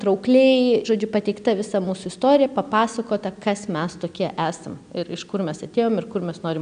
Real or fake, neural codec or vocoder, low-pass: real; none; 9.9 kHz